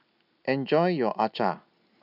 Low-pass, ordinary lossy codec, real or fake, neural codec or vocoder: 5.4 kHz; none; real; none